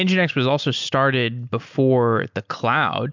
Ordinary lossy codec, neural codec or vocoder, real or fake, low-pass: MP3, 64 kbps; none; real; 7.2 kHz